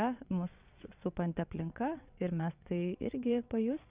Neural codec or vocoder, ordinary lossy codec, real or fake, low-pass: vocoder, 22.05 kHz, 80 mel bands, WaveNeXt; Opus, 64 kbps; fake; 3.6 kHz